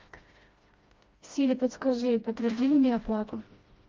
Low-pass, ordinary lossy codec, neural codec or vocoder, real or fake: 7.2 kHz; Opus, 32 kbps; codec, 16 kHz, 1 kbps, FreqCodec, smaller model; fake